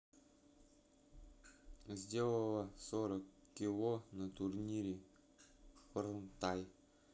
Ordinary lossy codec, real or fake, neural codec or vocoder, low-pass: none; real; none; none